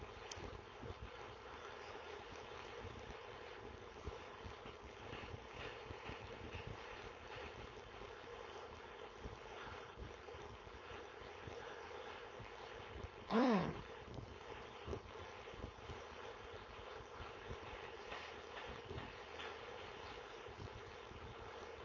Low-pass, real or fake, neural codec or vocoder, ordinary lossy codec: 7.2 kHz; fake; codec, 16 kHz, 4.8 kbps, FACodec; MP3, 32 kbps